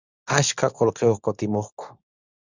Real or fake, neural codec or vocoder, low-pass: fake; codec, 24 kHz, 0.9 kbps, WavTokenizer, medium speech release version 2; 7.2 kHz